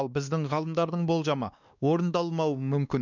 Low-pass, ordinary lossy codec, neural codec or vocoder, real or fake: 7.2 kHz; none; codec, 16 kHz, 2 kbps, X-Codec, WavLM features, trained on Multilingual LibriSpeech; fake